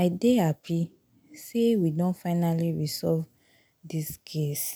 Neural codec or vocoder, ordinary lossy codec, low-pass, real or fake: none; none; none; real